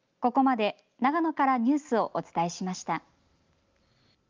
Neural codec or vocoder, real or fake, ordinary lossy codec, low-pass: none; real; Opus, 24 kbps; 7.2 kHz